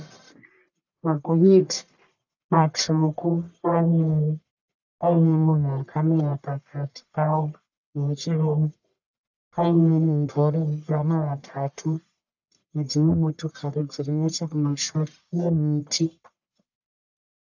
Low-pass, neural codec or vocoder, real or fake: 7.2 kHz; codec, 44.1 kHz, 1.7 kbps, Pupu-Codec; fake